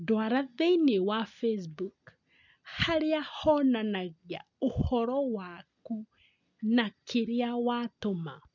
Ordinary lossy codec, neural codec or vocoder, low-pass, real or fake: none; none; 7.2 kHz; real